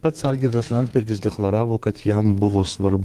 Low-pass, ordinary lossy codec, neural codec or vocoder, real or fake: 14.4 kHz; Opus, 24 kbps; codec, 32 kHz, 1.9 kbps, SNAC; fake